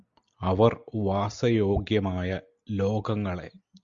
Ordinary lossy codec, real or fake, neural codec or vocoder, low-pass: Opus, 64 kbps; real; none; 7.2 kHz